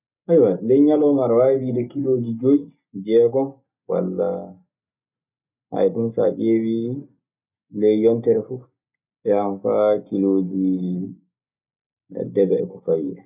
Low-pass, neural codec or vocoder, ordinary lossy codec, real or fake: 3.6 kHz; none; none; real